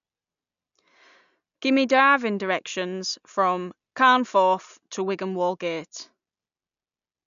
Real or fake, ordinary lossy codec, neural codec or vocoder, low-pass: real; none; none; 7.2 kHz